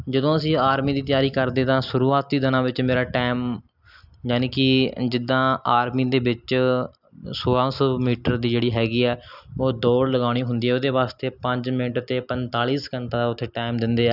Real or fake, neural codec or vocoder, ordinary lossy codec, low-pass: real; none; none; 5.4 kHz